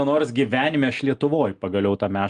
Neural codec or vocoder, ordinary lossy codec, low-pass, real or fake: none; Opus, 32 kbps; 9.9 kHz; real